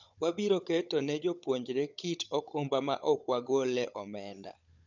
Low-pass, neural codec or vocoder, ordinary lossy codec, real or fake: 7.2 kHz; codec, 16 kHz, 16 kbps, FunCodec, trained on Chinese and English, 50 frames a second; none; fake